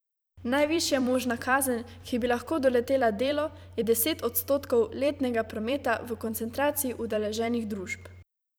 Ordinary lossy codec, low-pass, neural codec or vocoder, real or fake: none; none; vocoder, 44.1 kHz, 128 mel bands every 256 samples, BigVGAN v2; fake